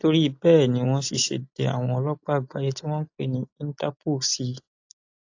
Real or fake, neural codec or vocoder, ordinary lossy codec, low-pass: real; none; AAC, 48 kbps; 7.2 kHz